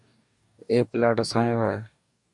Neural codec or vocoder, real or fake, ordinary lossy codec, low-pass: codec, 44.1 kHz, 2.6 kbps, DAC; fake; MP3, 96 kbps; 10.8 kHz